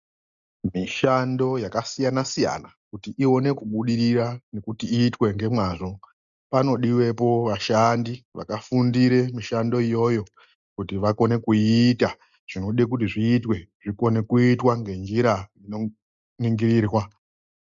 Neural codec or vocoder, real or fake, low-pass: none; real; 7.2 kHz